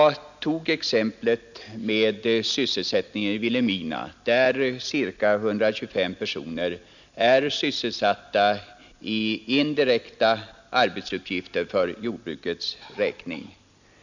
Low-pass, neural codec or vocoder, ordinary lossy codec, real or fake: 7.2 kHz; none; none; real